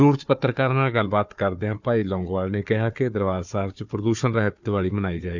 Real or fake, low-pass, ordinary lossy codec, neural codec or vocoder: fake; 7.2 kHz; none; codec, 16 kHz, 4 kbps, FunCodec, trained on Chinese and English, 50 frames a second